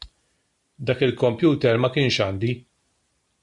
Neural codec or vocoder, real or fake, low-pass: none; real; 10.8 kHz